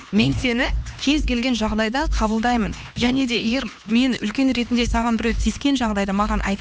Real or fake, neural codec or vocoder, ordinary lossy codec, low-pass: fake; codec, 16 kHz, 2 kbps, X-Codec, HuBERT features, trained on LibriSpeech; none; none